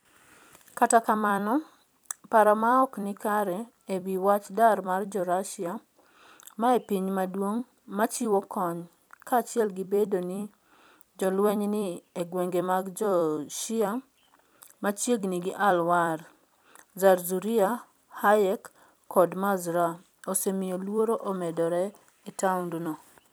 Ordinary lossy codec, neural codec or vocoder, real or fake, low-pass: none; vocoder, 44.1 kHz, 128 mel bands every 256 samples, BigVGAN v2; fake; none